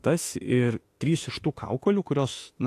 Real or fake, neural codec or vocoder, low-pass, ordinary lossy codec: fake; autoencoder, 48 kHz, 32 numbers a frame, DAC-VAE, trained on Japanese speech; 14.4 kHz; AAC, 48 kbps